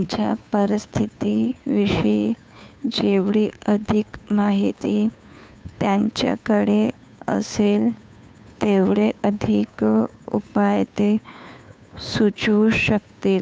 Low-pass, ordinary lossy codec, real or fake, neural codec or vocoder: none; none; fake; codec, 16 kHz, 2 kbps, FunCodec, trained on Chinese and English, 25 frames a second